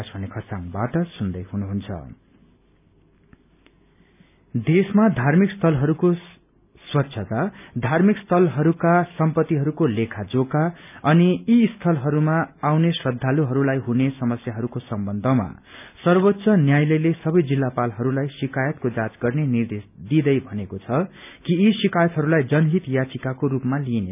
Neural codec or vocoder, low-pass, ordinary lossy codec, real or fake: none; 3.6 kHz; none; real